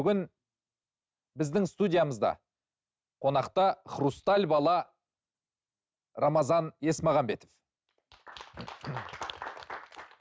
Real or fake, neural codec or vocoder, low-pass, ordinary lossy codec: real; none; none; none